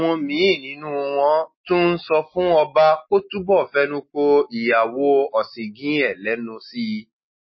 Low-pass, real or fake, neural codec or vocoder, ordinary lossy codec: 7.2 kHz; real; none; MP3, 24 kbps